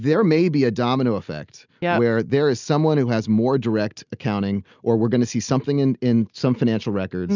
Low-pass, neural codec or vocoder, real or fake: 7.2 kHz; none; real